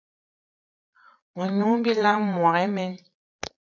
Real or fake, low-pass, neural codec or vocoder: fake; 7.2 kHz; vocoder, 22.05 kHz, 80 mel bands, Vocos